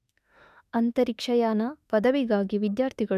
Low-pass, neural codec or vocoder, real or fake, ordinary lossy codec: 14.4 kHz; autoencoder, 48 kHz, 32 numbers a frame, DAC-VAE, trained on Japanese speech; fake; none